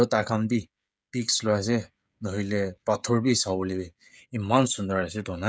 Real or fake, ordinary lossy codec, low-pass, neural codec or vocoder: fake; none; none; codec, 16 kHz, 16 kbps, FreqCodec, smaller model